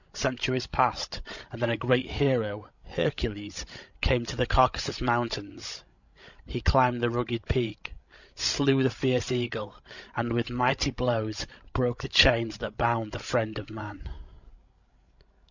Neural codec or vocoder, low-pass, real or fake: codec, 16 kHz, 16 kbps, FreqCodec, larger model; 7.2 kHz; fake